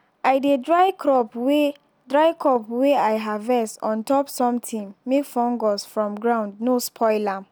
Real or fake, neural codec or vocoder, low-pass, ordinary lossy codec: real; none; none; none